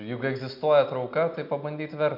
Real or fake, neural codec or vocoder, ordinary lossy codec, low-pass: real; none; MP3, 48 kbps; 5.4 kHz